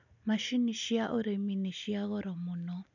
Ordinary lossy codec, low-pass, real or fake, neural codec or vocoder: none; 7.2 kHz; real; none